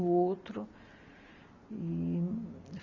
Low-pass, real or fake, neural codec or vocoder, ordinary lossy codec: 7.2 kHz; real; none; none